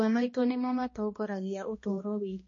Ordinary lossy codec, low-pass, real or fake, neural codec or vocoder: MP3, 32 kbps; 7.2 kHz; fake; codec, 16 kHz, 1 kbps, X-Codec, HuBERT features, trained on balanced general audio